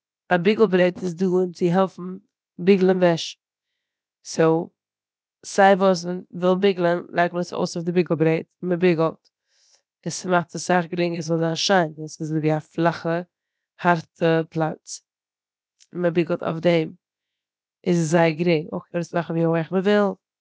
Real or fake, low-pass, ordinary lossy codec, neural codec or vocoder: fake; none; none; codec, 16 kHz, about 1 kbps, DyCAST, with the encoder's durations